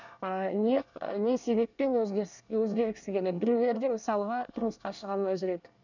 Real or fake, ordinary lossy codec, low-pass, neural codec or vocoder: fake; none; 7.2 kHz; codec, 24 kHz, 1 kbps, SNAC